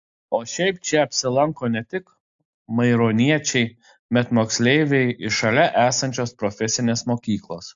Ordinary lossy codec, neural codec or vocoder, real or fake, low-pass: AAC, 64 kbps; none; real; 7.2 kHz